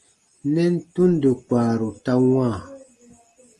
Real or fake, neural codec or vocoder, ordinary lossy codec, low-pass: real; none; Opus, 24 kbps; 10.8 kHz